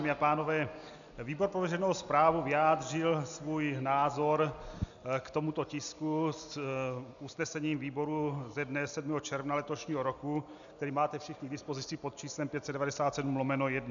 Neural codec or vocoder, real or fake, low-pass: none; real; 7.2 kHz